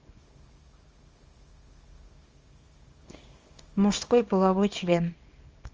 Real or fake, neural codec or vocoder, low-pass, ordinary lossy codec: fake; codec, 24 kHz, 0.9 kbps, WavTokenizer, small release; 7.2 kHz; Opus, 24 kbps